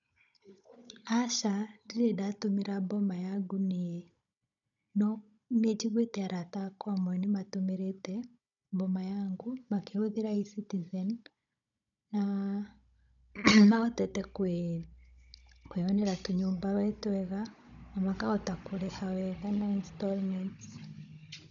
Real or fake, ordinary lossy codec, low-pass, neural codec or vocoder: fake; none; 7.2 kHz; codec, 16 kHz, 16 kbps, FunCodec, trained on Chinese and English, 50 frames a second